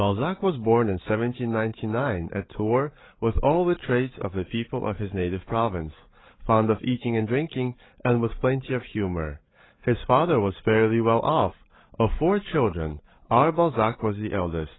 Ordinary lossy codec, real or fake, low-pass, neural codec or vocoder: AAC, 16 kbps; fake; 7.2 kHz; codec, 16 kHz, 8 kbps, FreqCodec, larger model